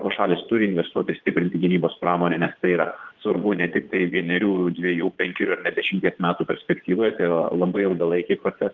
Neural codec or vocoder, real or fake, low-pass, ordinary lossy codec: vocoder, 22.05 kHz, 80 mel bands, Vocos; fake; 7.2 kHz; Opus, 16 kbps